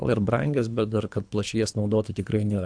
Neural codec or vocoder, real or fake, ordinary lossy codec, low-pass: codec, 24 kHz, 3 kbps, HILCodec; fake; AAC, 64 kbps; 9.9 kHz